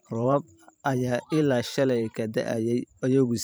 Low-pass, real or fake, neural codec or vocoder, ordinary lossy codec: none; fake; vocoder, 44.1 kHz, 128 mel bands every 512 samples, BigVGAN v2; none